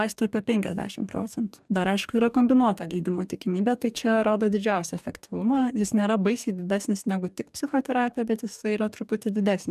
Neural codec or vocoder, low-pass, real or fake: codec, 44.1 kHz, 2.6 kbps, DAC; 14.4 kHz; fake